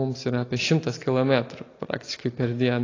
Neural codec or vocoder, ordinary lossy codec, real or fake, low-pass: none; AAC, 32 kbps; real; 7.2 kHz